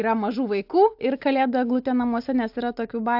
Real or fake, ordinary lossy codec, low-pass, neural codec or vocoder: real; AAC, 48 kbps; 5.4 kHz; none